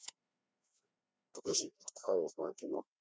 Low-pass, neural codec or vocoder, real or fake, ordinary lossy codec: none; codec, 16 kHz, 2 kbps, FreqCodec, larger model; fake; none